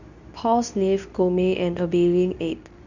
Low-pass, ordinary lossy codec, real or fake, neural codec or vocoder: 7.2 kHz; none; fake; codec, 24 kHz, 0.9 kbps, WavTokenizer, medium speech release version 2